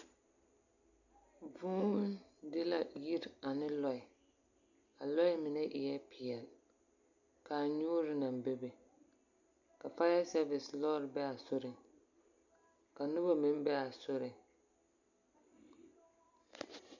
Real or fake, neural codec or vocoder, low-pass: real; none; 7.2 kHz